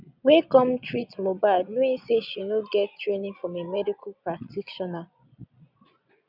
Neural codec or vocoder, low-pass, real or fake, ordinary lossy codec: vocoder, 22.05 kHz, 80 mel bands, Vocos; 5.4 kHz; fake; none